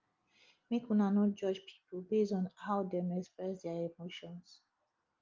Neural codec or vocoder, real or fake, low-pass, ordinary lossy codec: none; real; 7.2 kHz; Opus, 32 kbps